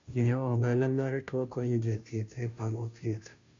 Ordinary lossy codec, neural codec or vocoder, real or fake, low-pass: AAC, 64 kbps; codec, 16 kHz, 0.5 kbps, FunCodec, trained on Chinese and English, 25 frames a second; fake; 7.2 kHz